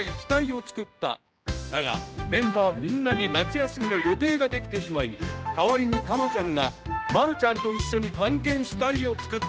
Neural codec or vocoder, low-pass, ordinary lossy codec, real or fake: codec, 16 kHz, 1 kbps, X-Codec, HuBERT features, trained on general audio; none; none; fake